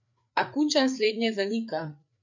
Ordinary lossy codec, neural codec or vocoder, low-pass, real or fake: none; codec, 16 kHz, 4 kbps, FreqCodec, larger model; 7.2 kHz; fake